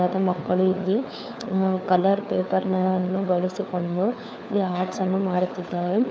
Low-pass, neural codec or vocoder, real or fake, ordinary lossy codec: none; codec, 16 kHz, 4 kbps, FunCodec, trained on LibriTTS, 50 frames a second; fake; none